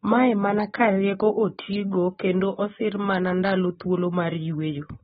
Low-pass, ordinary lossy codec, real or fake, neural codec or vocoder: 19.8 kHz; AAC, 16 kbps; real; none